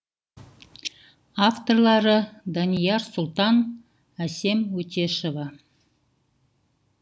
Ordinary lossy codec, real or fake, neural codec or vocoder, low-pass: none; real; none; none